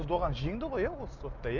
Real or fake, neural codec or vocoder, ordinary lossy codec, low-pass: real; none; none; 7.2 kHz